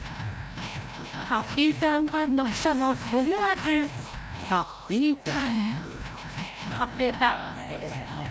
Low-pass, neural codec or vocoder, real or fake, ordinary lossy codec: none; codec, 16 kHz, 0.5 kbps, FreqCodec, larger model; fake; none